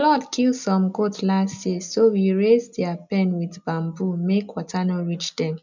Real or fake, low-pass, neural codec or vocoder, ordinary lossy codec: real; 7.2 kHz; none; none